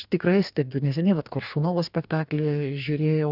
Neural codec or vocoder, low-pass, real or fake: codec, 44.1 kHz, 2.6 kbps, DAC; 5.4 kHz; fake